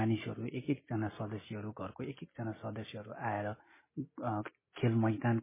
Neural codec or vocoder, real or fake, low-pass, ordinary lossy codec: none; real; 3.6 kHz; MP3, 16 kbps